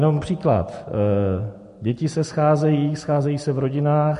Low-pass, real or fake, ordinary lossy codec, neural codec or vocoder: 10.8 kHz; real; MP3, 48 kbps; none